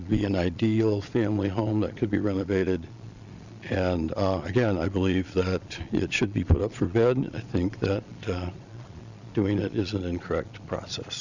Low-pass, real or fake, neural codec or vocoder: 7.2 kHz; fake; vocoder, 22.05 kHz, 80 mel bands, Vocos